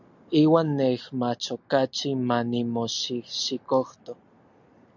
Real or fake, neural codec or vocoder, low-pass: real; none; 7.2 kHz